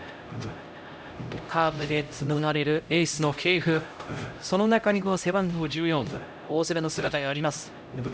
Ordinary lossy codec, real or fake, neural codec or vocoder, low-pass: none; fake; codec, 16 kHz, 0.5 kbps, X-Codec, HuBERT features, trained on LibriSpeech; none